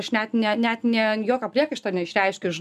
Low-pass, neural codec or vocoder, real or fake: 14.4 kHz; none; real